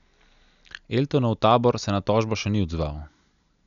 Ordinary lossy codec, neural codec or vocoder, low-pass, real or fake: none; none; 7.2 kHz; real